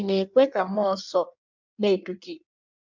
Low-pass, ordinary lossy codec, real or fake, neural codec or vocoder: 7.2 kHz; MP3, 64 kbps; fake; codec, 16 kHz in and 24 kHz out, 1.1 kbps, FireRedTTS-2 codec